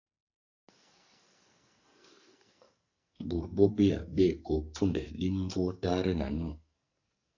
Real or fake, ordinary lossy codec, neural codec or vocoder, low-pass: fake; AAC, 48 kbps; codec, 44.1 kHz, 2.6 kbps, SNAC; 7.2 kHz